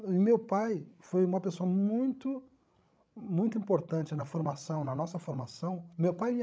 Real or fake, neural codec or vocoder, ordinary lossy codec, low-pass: fake; codec, 16 kHz, 16 kbps, FreqCodec, larger model; none; none